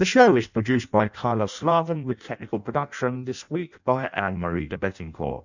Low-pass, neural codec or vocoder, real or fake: 7.2 kHz; codec, 16 kHz in and 24 kHz out, 0.6 kbps, FireRedTTS-2 codec; fake